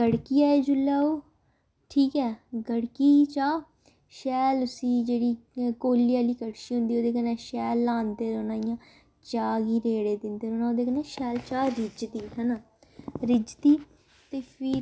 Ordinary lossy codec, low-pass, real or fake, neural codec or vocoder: none; none; real; none